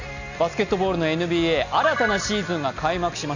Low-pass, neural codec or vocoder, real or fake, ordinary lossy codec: 7.2 kHz; none; real; none